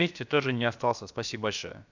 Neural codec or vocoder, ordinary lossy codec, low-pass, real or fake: codec, 16 kHz, about 1 kbps, DyCAST, with the encoder's durations; none; 7.2 kHz; fake